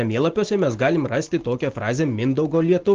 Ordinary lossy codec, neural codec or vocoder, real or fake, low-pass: Opus, 16 kbps; none; real; 7.2 kHz